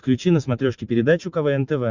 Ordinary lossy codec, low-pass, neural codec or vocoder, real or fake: Opus, 64 kbps; 7.2 kHz; none; real